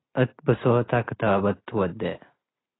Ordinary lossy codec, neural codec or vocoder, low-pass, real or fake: AAC, 16 kbps; none; 7.2 kHz; real